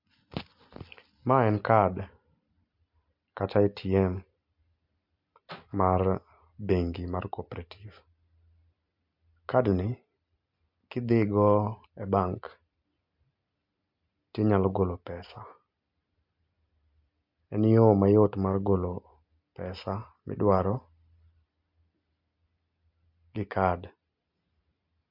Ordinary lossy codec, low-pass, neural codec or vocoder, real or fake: MP3, 48 kbps; 5.4 kHz; none; real